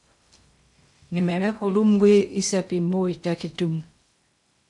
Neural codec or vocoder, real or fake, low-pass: codec, 16 kHz in and 24 kHz out, 0.6 kbps, FocalCodec, streaming, 2048 codes; fake; 10.8 kHz